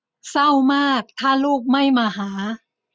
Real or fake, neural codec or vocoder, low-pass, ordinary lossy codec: real; none; none; none